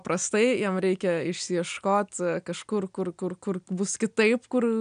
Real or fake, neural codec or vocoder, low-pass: real; none; 9.9 kHz